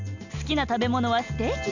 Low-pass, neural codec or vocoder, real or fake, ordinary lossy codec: 7.2 kHz; none; real; none